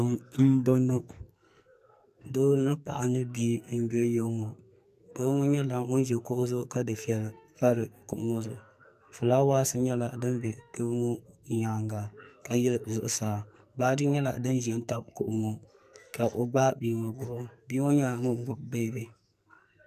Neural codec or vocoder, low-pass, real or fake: codec, 44.1 kHz, 2.6 kbps, SNAC; 14.4 kHz; fake